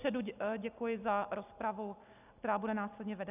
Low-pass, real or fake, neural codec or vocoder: 3.6 kHz; real; none